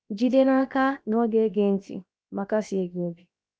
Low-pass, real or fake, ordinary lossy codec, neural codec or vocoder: none; fake; none; codec, 16 kHz, 0.7 kbps, FocalCodec